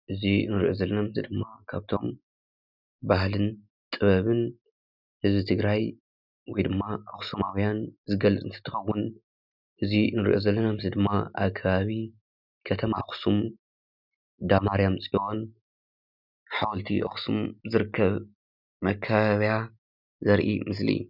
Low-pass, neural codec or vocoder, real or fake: 5.4 kHz; none; real